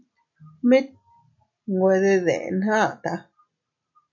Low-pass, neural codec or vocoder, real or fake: 7.2 kHz; none; real